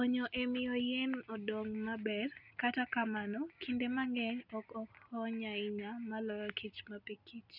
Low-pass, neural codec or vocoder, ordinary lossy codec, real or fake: 5.4 kHz; none; none; real